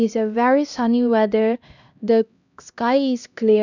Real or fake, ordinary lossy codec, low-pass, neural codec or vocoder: fake; none; 7.2 kHz; codec, 16 kHz, 1 kbps, X-Codec, HuBERT features, trained on LibriSpeech